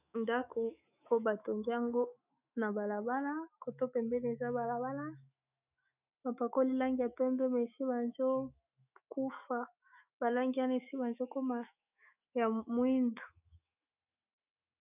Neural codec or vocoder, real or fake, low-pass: autoencoder, 48 kHz, 128 numbers a frame, DAC-VAE, trained on Japanese speech; fake; 3.6 kHz